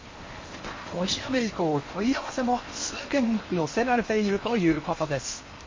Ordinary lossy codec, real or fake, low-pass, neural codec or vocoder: MP3, 32 kbps; fake; 7.2 kHz; codec, 16 kHz in and 24 kHz out, 0.8 kbps, FocalCodec, streaming, 65536 codes